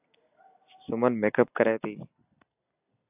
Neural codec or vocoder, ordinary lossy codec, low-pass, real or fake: none; AAC, 24 kbps; 3.6 kHz; real